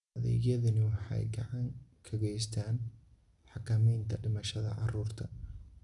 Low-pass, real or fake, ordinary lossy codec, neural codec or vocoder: 10.8 kHz; real; none; none